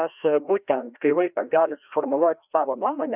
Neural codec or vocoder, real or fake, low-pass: codec, 16 kHz, 1 kbps, FreqCodec, larger model; fake; 3.6 kHz